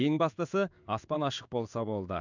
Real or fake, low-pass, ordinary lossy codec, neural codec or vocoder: fake; 7.2 kHz; none; codec, 16 kHz in and 24 kHz out, 1 kbps, XY-Tokenizer